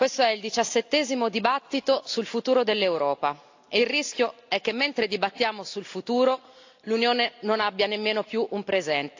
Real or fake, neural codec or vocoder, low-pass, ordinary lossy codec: real; none; 7.2 kHz; AAC, 48 kbps